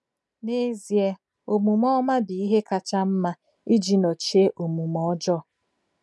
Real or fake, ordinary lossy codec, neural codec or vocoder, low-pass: real; none; none; none